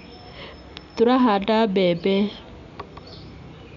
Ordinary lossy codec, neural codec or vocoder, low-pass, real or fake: MP3, 96 kbps; none; 7.2 kHz; real